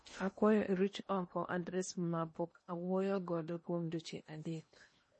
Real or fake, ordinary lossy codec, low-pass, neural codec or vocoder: fake; MP3, 32 kbps; 10.8 kHz; codec, 16 kHz in and 24 kHz out, 0.8 kbps, FocalCodec, streaming, 65536 codes